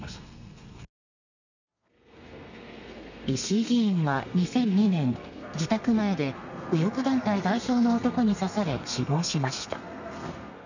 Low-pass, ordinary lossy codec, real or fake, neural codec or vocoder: 7.2 kHz; none; fake; codec, 32 kHz, 1.9 kbps, SNAC